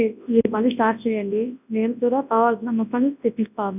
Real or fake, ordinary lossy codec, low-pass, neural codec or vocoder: fake; none; 3.6 kHz; codec, 24 kHz, 0.9 kbps, WavTokenizer, large speech release